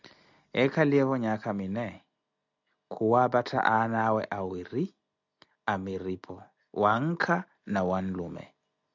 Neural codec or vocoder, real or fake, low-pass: none; real; 7.2 kHz